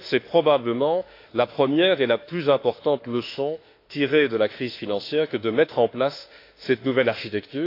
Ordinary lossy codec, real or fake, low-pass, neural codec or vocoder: AAC, 32 kbps; fake; 5.4 kHz; autoencoder, 48 kHz, 32 numbers a frame, DAC-VAE, trained on Japanese speech